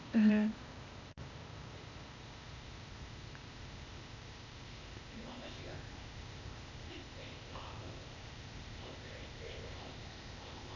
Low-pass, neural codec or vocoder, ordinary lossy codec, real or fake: 7.2 kHz; codec, 16 kHz, 0.8 kbps, ZipCodec; none; fake